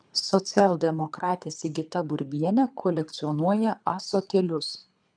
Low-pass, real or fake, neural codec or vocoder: 9.9 kHz; fake; codec, 24 kHz, 3 kbps, HILCodec